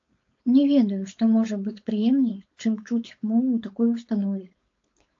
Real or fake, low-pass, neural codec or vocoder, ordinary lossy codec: fake; 7.2 kHz; codec, 16 kHz, 4.8 kbps, FACodec; AAC, 48 kbps